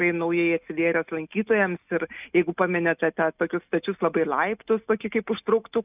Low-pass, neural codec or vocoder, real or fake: 3.6 kHz; none; real